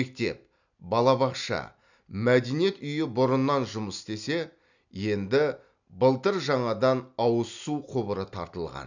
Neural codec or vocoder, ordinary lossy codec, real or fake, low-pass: none; none; real; 7.2 kHz